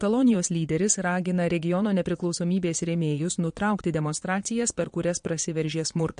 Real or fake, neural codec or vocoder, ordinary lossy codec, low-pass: fake; vocoder, 22.05 kHz, 80 mel bands, Vocos; MP3, 48 kbps; 9.9 kHz